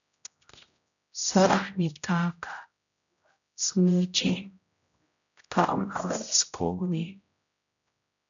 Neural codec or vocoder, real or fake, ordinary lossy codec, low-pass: codec, 16 kHz, 0.5 kbps, X-Codec, HuBERT features, trained on general audio; fake; MP3, 96 kbps; 7.2 kHz